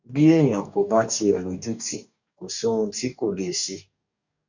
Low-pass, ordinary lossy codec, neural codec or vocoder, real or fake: 7.2 kHz; none; codec, 44.1 kHz, 2.6 kbps, DAC; fake